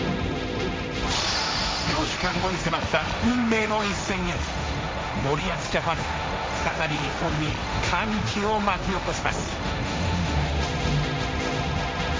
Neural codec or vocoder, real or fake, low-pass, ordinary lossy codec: codec, 16 kHz, 1.1 kbps, Voila-Tokenizer; fake; none; none